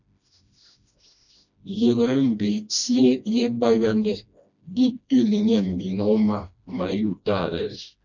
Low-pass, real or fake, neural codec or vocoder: 7.2 kHz; fake; codec, 16 kHz, 1 kbps, FreqCodec, smaller model